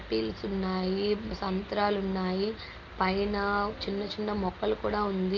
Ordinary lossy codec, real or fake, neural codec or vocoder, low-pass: Opus, 24 kbps; real; none; 7.2 kHz